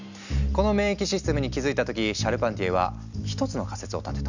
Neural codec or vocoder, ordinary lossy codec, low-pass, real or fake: none; none; 7.2 kHz; real